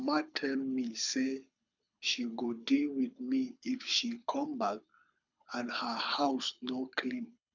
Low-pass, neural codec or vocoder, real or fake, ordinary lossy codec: 7.2 kHz; codec, 24 kHz, 6 kbps, HILCodec; fake; none